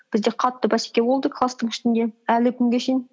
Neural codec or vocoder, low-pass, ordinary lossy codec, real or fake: none; none; none; real